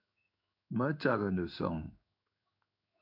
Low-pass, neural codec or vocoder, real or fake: 5.4 kHz; codec, 16 kHz in and 24 kHz out, 1 kbps, XY-Tokenizer; fake